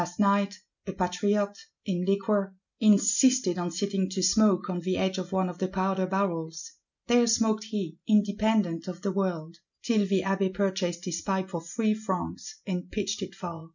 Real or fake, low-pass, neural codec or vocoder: real; 7.2 kHz; none